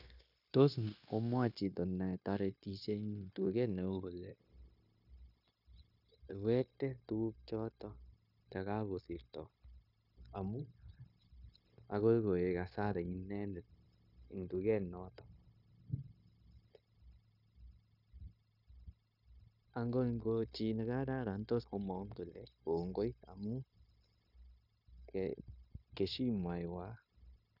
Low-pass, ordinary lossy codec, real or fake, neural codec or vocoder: 5.4 kHz; none; fake; codec, 16 kHz, 0.9 kbps, LongCat-Audio-Codec